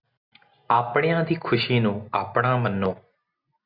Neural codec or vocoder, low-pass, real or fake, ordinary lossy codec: none; 5.4 kHz; real; Opus, 64 kbps